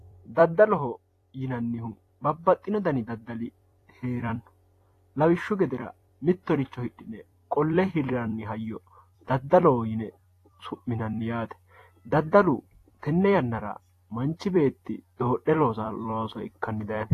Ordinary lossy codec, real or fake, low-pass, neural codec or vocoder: AAC, 48 kbps; fake; 14.4 kHz; vocoder, 44.1 kHz, 128 mel bands every 256 samples, BigVGAN v2